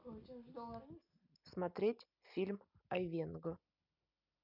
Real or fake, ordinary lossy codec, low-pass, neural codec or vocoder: real; none; 5.4 kHz; none